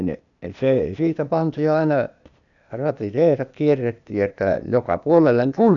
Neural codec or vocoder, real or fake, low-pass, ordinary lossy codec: codec, 16 kHz, 0.8 kbps, ZipCodec; fake; 7.2 kHz; none